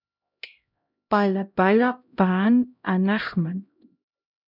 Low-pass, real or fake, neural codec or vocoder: 5.4 kHz; fake; codec, 16 kHz, 0.5 kbps, X-Codec, HuBERT features, trained on LibriSpeech